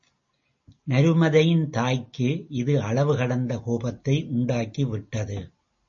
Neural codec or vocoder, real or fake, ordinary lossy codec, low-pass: none; real; MP3, 32 kbps; 7.2 kHz